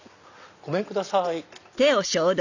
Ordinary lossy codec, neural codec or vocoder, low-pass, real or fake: none; none; 7.2 kHz; real